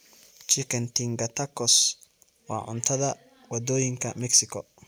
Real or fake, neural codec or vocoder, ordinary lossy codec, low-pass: real; none; none; none